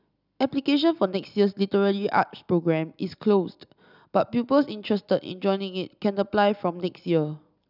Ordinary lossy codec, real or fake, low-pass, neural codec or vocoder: none; real; 5.4 kHz; none